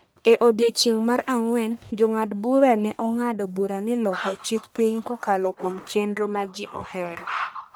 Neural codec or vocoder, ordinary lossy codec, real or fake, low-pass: codec, 44.1 kHz, 1.7 kbps, Pupu-Codec; none; fake; none